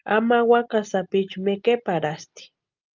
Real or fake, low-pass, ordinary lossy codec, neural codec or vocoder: real; 7.2 kHz; Opus, 24 kbps; none